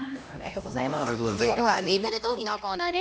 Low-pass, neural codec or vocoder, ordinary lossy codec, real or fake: none; codec, 16 kHz, 1 kbps, X-Codec, HuBERT features, trained on LibriSpeech; none; fake